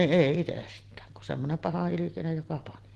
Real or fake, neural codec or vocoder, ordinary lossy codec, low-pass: real; none; Opus, 16 kbps; 10.8 kHz